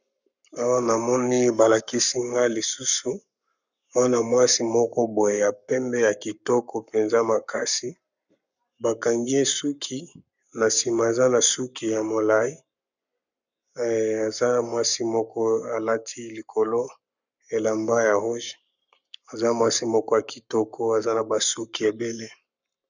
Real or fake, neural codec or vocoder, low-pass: fake; codec, 44.1 kHz, 7.8 kbps, Pupu-Codec; 7.2 kHz